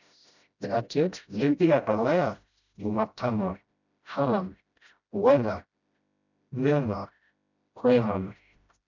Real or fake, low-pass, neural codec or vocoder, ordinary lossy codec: fake; 7.2 kHz; codec, 16 kHz, 0.5 kbps, FreqCodec, smaller model; none